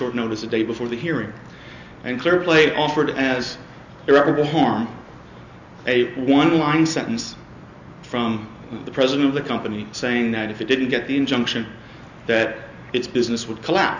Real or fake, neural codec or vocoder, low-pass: real; none; 7.2 kHz